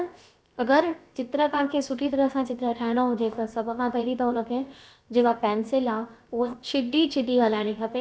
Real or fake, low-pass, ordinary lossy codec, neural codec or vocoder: fake; none; none; codec, 16 kHz, about 1 kbps, DyCAST, with the encoder's durations